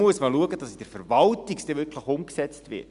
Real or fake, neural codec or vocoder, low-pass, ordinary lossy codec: real; none; 10.8 kHz; none